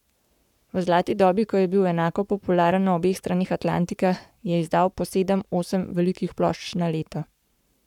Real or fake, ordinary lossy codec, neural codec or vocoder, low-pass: fake; none; codec, 44.1 kHz, 7.8 kbps, Pupu-Codec; 19.8 kHz